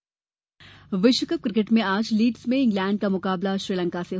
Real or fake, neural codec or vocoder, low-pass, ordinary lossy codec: real; none; none; none